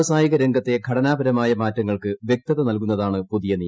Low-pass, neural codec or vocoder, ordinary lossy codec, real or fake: none; none; none; real